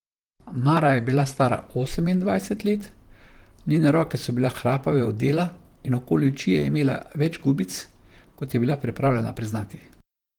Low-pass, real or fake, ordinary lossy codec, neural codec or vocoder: 19.8 kHz; fake; Opus, 24 kbps; vocoder, 44.1 kHz, 128 mel bands, Pupu-Vocoder